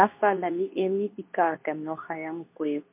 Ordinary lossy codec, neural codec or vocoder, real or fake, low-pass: MP3, 32 kbps; codec, 24 kHz, 0.9 kbps, WavTokenizer, medium speech release version 2; fake; 3.6 kHz